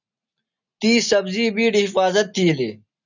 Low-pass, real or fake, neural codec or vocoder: 7.2 kHz; real; none